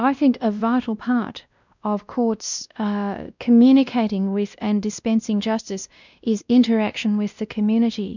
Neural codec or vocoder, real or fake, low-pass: codec, 16 kHz, 1 kbps, X-Codec, WavLM features, trained on Multilingual LibriSpeech; fake; 7.2 kHz